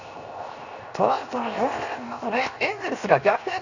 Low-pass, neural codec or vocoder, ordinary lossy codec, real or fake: 7.2 kHz; codec, 16 kHz, 0.7 kbps, FocalCodec; none; fake